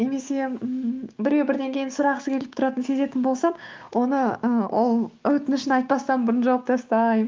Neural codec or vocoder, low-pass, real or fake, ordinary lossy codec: codec, 16 kHz, 6 kbps, DAC; 7.2 kHz; fake; Opus, 32 kbps